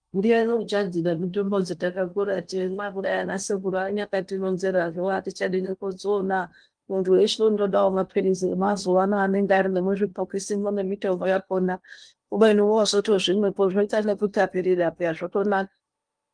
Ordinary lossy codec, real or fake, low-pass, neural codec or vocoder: Opus, 32 kbps; fake; 9.9 kHz; codec, 16 kHz in and 24 kHz out, 0.8 kbps, FocalCodec, streaming, 65536 codes